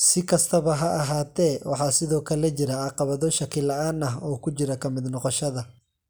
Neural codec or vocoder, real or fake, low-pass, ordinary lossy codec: none; real; none; none